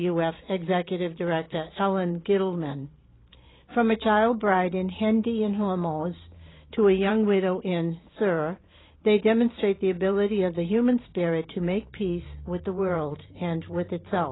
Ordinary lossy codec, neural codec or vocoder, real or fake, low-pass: AAC, 16 kbps; vocoder, 44.1 kHz, 128 mel bands every 512 samples, BigVGAN v2; fake; 7.2 kHz